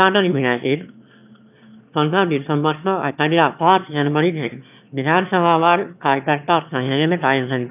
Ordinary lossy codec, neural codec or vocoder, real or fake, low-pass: none; autoencoder, 22.05 kHz, a latent of 192 numbers a frame, VITS, trained on one speaker; fake; 3.6 kHz